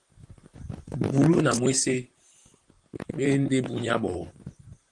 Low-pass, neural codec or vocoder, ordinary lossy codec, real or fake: 10.8 kHz; vocoder, 44.1 kHz, 128 mel bands, Pupu-Vocoder; Opus, 24 kbps; fake